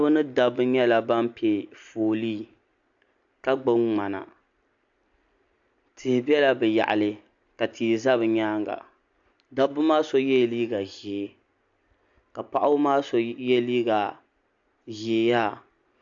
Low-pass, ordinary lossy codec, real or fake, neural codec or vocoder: 7.2 kHz; AAC, 64 kbps; real; none